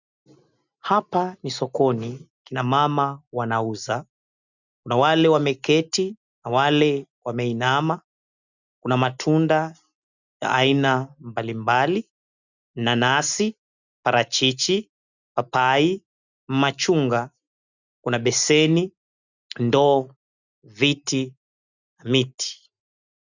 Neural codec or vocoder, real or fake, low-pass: none; real; 7.2 kHz